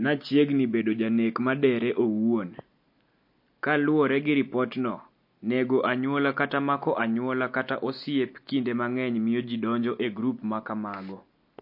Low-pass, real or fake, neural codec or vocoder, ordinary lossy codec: 5.4 kHz; real; none; MP3, 32 kbps